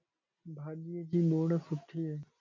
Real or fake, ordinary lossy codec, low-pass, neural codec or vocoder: real; MP3, 64 kbps; 7.2 kHz; none